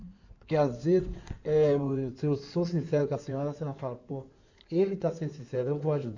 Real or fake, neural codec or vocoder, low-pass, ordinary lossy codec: fake; codec, 16 kHz in and 24 kHz out, 2.2 kbps, FireRedTTS-2 codec; 7.2 kHz; none